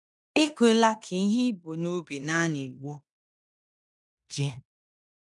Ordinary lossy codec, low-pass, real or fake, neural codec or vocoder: none; 10.8 kHz; fake; codec, 16 kHz in and 24 kHz out, 0.9 kbps, LongCat-Audio-Codec, fine tuned four codebook decoder